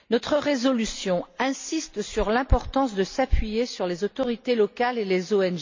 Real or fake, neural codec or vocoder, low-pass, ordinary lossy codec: real; none; 7.2 kHz; MP3, 64 kbps